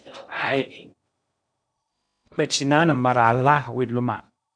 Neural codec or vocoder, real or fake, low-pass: codec, 16 kHz in and 24 kHz out, 0.8 kbps, FocalCodec, streaming, 65536 codes; fake; 9.9 kHz